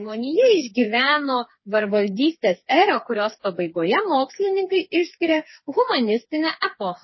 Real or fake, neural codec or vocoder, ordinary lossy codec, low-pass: fake; codec, 16 kHz, 4 kbps, FreqCodec, smaller model; MP3, 24 kbps; 7.2 kHz